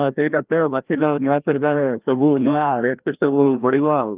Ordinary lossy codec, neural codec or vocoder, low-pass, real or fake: Opus, 32 kbps; codec, 16 kHz, 1 kbps, FreqCodec, larger model; 3.6 kHz; fake